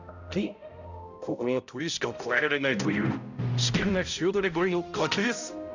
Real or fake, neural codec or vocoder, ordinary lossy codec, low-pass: fake; codec, 16 kHz, 0.5 kbps, X-Codec, HuBERT features, trained on balanced general audio; none; 7.2 kHz